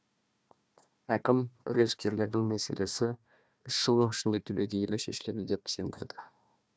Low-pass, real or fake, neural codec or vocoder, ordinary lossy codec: none; fake; codec, 16 kHz, 1 kbps, FunCodec, trained on Chinese and English, 50 frames a second; none